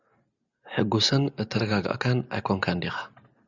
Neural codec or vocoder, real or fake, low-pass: none; real; 7.2 kHz